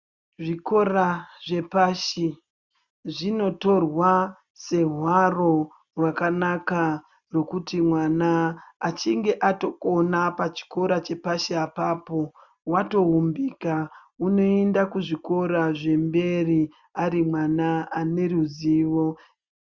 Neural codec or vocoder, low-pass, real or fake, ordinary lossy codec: none; 7.2 kHz; real; Opus, 64 kbps